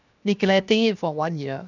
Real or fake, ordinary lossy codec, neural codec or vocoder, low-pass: fake; none; codec, 16 kHz, 0.7 kbps, FocalCodec; 7.2 kHz